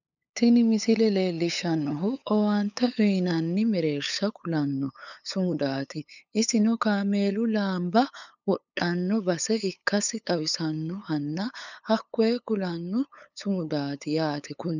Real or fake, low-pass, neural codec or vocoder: fake; 7.2 kHz; codec, 16 kHz, 8 kbps, FunCodec, trained on LibriTTS, 25 frames a second